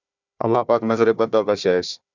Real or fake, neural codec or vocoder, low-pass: fake; codec, 16 kHz, 1 kbps, FunCodec, trained on Chinese and English, 50 frames a second; 7.2 kHz